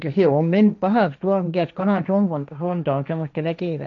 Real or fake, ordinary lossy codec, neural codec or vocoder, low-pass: fake; none; codec, 16 kHz, 1.1 kbps, Voila-Tokenizer; 7.2 kHz